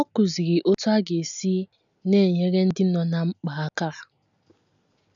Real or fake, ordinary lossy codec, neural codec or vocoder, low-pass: real; none; none; 7.2 kHz